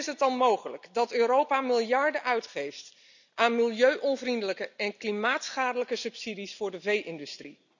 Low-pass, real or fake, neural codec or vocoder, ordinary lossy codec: 7.2 kHz; real; none; none